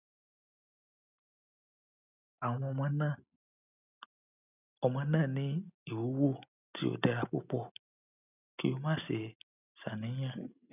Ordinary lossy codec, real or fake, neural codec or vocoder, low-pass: none; real; none; 3.6 kHz